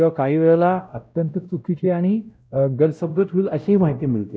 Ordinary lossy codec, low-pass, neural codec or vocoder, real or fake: none; none; codec, 16 kHz, 0.5 kbps, X-Codec, WavLM features, trained on Multilingual LibriSpeech; fake